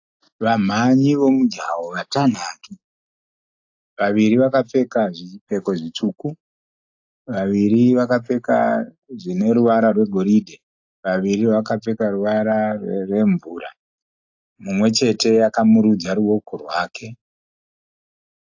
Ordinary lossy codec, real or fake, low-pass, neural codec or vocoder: AAC, 48 kbps; real; 7.2 kHz; none